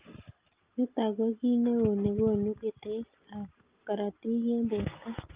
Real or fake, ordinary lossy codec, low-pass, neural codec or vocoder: real; Opus, 24 kbps; 3.6 kHz; none